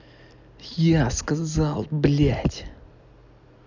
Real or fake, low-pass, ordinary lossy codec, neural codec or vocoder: real; 7.2 kHz; none; none